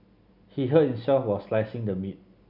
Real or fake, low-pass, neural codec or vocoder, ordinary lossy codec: real; 5.4 kHz; none; none